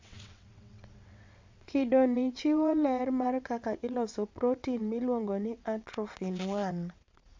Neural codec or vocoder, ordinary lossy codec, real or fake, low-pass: vocoder, 22.05 kHz, 80 mel bands, WaveNeXt; MP3, 64 kbps; fake; 7.2 kHz